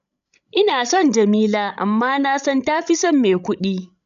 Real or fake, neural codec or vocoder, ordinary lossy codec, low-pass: fake; codec, 16 kHz, 16 kbps, FreqCodec, larger model; none; 7.2 kHz